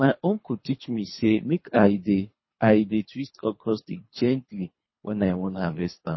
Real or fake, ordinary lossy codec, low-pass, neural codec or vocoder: fake; MP3, 24 kbps; 7.2 kHz; codec, 24 kHz, 3 kbps, HILCodec